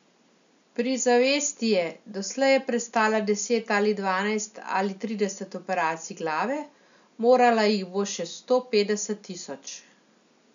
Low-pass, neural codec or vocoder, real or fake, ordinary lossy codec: 7.2 kHz; none; real; none